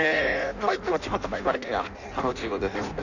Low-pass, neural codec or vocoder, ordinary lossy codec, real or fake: 7.2 kHz; codec, 16 kHz in and 24 kHz out, 0.6 kbps, FireRedTTS-2 codec; none; fake